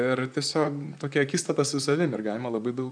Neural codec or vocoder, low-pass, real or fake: vocoder, 44.1 kHz, 128 mel bands, Pupu-Vocoder; 9.9 kHz; fake